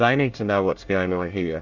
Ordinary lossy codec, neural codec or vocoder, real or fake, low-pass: Opus, 64 kbps; codec, 24 kHz, 1 kbps, SNAC; fake; 7.2 kHz